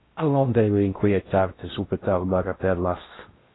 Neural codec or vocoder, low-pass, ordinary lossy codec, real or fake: codec, 16 kHz in and 24 kHz out, 0.6 kbps, FocalCodec, streaming, 4096 codes; 7.2 kHz; AAC, 16 kbps; fake